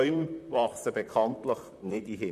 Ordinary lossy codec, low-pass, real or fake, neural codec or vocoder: none; 14.4 kHz; fake; vocoder, 44.1 kHz, 128 mel bands, Pupu-Vocoder